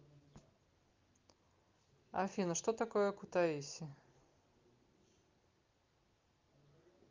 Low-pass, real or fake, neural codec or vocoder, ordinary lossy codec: 7.2 kHz; real; none; Opus, 32 kbps